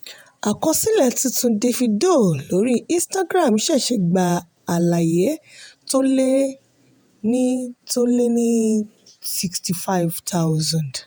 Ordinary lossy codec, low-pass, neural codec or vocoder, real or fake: none; none; vocoder, 48 kHz, 128 mel bands, Vocos; fake